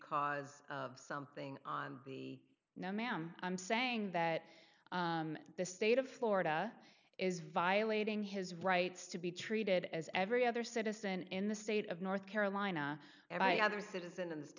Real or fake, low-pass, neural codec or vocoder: real; 7.2 kHz; none